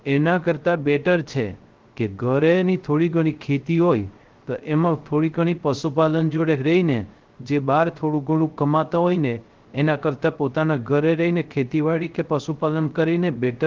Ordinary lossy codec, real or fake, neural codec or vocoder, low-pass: Opus, 16 kbps; fake; codec, 16 kHz, 0.3 kbps, FocalCodec; 7.2 kHz